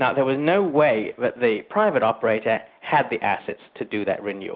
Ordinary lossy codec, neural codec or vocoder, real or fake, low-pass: Opus, 32 kbps; none; real; 5.4 kHz